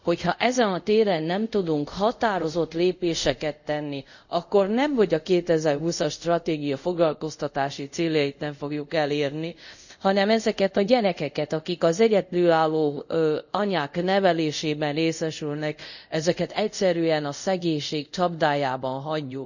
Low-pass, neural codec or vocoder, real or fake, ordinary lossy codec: 7.2 kHz; codec, 24 kHz, 0.5 kbps, DualCodec; fake; none